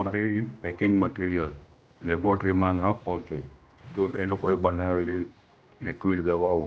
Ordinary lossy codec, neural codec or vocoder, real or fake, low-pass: none; codec, 16 kHz, 1 kbps, X-Codec, HuBERT features, trained on general audio; fake; none